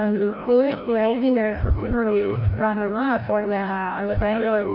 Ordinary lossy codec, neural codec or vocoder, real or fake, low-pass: none; codec, 16 kHz, 0.5 kbps, FreqCodec, larger model; fake; 5.4 kHz